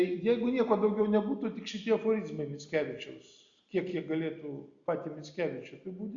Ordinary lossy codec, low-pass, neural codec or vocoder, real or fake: Opus, 64 kbps; 7.2 kHz; none; real